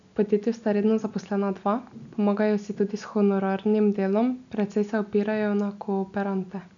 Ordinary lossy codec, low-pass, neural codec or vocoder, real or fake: none; 7.2 kHz; none; real